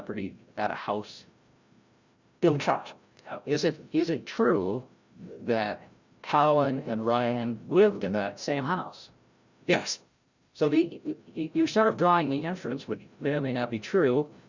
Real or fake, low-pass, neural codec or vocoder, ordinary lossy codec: fake; 7.2 kHz; codec, 16 kHz, 0.5 kbps, FreqCodec, larger model; Opus, 64 kbps